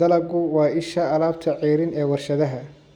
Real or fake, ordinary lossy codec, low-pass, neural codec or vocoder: real; none; 19.8 kHz; none